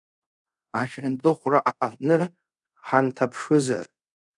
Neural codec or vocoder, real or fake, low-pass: codec, 24 kHz, 0.5 kbps, DualCodec; fake; 10.8 kHz